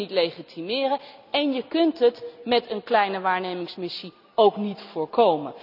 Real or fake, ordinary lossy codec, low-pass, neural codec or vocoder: real; none; 5.4 kHz; none